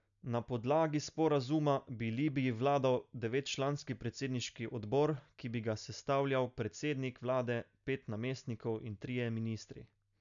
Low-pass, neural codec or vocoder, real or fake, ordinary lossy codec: 7.2 kHz; none; real; none